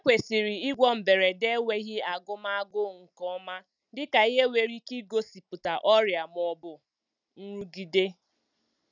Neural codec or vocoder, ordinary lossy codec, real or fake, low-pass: none; none; real; 7.2 kHz